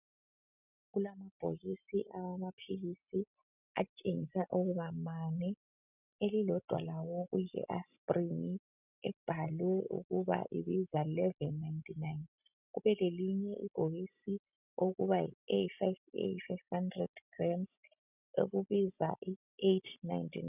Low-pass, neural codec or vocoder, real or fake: 3.6 kHz; none; real